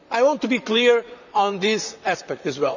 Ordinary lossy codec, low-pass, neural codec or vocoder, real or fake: none; 7.2 kHz; vocoder, 44.1 kHz, 128 mel bands, Pupu-Vocoder; fake